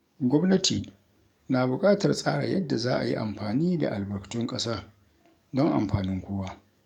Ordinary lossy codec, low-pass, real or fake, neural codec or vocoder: none; 19.8 kHz; fake; codec, 44.1 kHz, 7.8 kbps, DAC